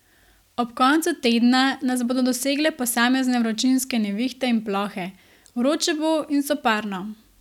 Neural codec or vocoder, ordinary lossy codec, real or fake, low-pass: none; none; real; 19.8 kHz